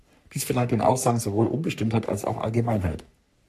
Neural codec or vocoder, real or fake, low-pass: codec, 44.1 kHz, 3.4 kbps, Pupu-Codec; fake; 14.4 kHz